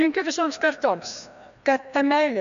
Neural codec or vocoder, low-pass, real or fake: codec, 16 kHz, 1 kbps, FreqCodec, larger model; 7.2 kHz; fake